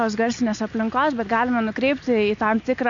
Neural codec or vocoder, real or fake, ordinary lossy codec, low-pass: none; real; AAC, 64 kbps; 7.2 kHz